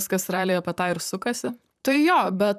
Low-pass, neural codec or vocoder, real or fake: 14.4 kHz; vocoder, 44.1 kHz, 128 mel bands, Pupu-Vocoder; fake